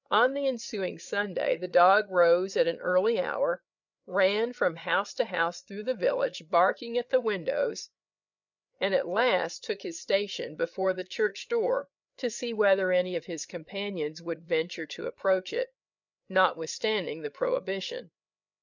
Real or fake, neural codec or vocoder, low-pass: fake; codec, 16 kHz, 8 kbps, FreqCodec, larger model; 7.2 kHz